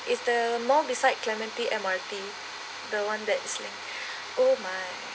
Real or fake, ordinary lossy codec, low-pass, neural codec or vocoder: real; none; none; none